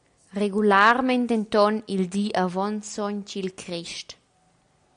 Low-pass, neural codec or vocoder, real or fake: 9.9 kHz; none; real